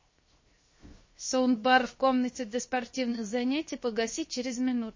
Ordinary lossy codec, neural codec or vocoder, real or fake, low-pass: MP3, 32 kbps; codec, 16 kHz, 0.7 kbps, FocalCodec; fake; 7.2 kHz